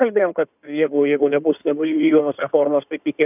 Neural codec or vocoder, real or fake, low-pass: codec, 16 kHz in and 24 kHz out, 2.2 kbps, FireRedTTS-2 codec; fake; 3.6 kHz